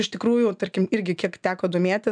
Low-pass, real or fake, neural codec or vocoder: 9.9 kHz; real; none